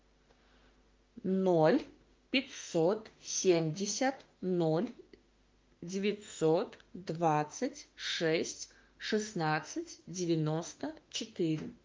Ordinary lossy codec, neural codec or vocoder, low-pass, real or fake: Opus, 32 kbps; autoencoder, 48 kHz, 32 numbers a frame, DAC-VAE, trained on Japanese speech; 7.2 kHz; fake